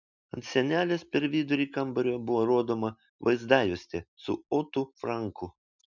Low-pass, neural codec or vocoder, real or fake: 7.2 kHz; none; real